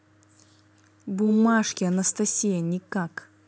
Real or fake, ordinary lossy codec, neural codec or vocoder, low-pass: real; none; none; none